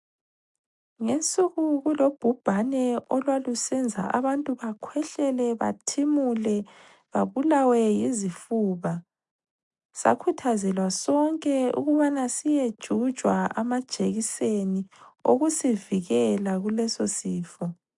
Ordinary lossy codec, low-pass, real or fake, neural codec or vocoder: MP3, 64 kbps; 10.8 kHz; real; none